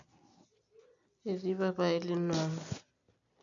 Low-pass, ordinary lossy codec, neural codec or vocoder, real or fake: 7.2 kHz; AAC, 64 kbps; none; real